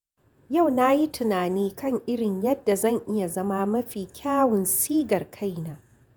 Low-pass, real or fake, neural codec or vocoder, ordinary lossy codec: none; fake; vocoder, 48 kHz, 128 mel bands, Vocos; none